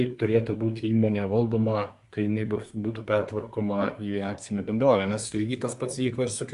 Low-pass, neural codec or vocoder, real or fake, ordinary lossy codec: 10.8 kHz; codec, 24 kHz, 1 kbps, SNAC; fake; Opus, 64 kbps